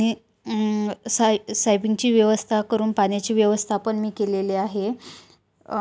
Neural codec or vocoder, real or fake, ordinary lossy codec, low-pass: none; real; none; none